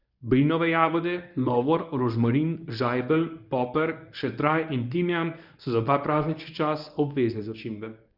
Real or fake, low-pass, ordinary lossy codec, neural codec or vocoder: fake; 5.4 kHz; none; codec, 24 kHz, 0.9 kbps, WavTokenizer, medium speech release version 1